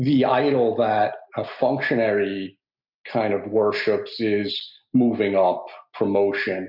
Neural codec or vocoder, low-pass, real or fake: none; 5.4 kHz; real